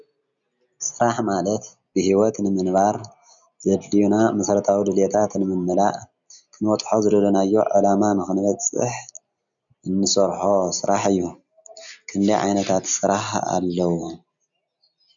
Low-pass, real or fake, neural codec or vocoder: 7.2 kHz; real; none